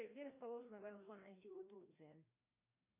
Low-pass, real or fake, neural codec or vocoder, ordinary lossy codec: 3.6 kHz; fake; codec, 16 kHz, 1 kbps, FreqCodec, larger model; AAC, 24 kbps